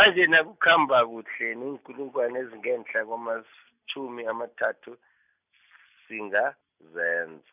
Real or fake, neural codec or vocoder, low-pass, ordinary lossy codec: real; none; 3.6 kHz; none